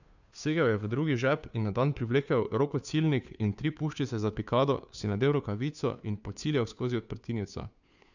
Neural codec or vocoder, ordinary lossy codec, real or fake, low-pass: codec, 16 kHz, 2 kbps, FunCodec, trained on Chinese and English, 25 frames a second; none; fake; 7.2 kHz